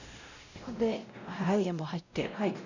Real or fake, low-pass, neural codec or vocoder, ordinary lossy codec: fake; 7.2 kHz; codec, 16 kHz, 0.5 kbps, X-Codec, WavLM features, trained on Multilingual LibriSpeech; none